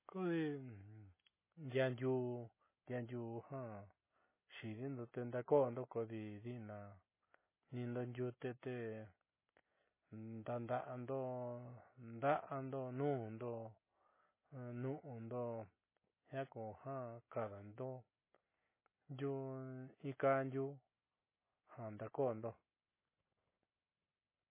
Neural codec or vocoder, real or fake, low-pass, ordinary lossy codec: none; real; 3.6 kHz; MP3, 16 kbps